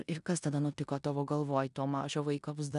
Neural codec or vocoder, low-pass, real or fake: codec, 16 kHz in and 24 kHz out, 0.9 kbps, LongCat-Audio-Codec, four codebook decoder; 10.8 kHz; fake